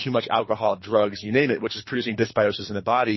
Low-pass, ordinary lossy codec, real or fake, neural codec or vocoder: 7.2 kHz; MP3, 24 kbps; fake; codec, 24 kHz, 3 kbps, HILCodec